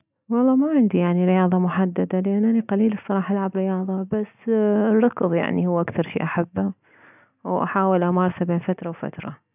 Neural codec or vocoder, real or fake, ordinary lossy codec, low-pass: none; real; none; 3.6 kHz